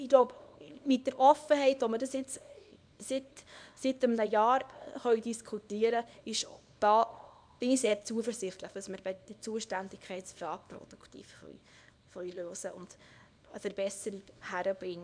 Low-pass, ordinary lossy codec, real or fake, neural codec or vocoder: 9.9 kHz; none; fake; codec, 24 kHz, 0.9 kbps, WavTokenizer, small release